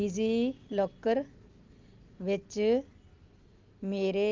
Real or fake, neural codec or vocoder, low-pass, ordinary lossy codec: real; none; 7.2 kHz; Opus, 24 kbps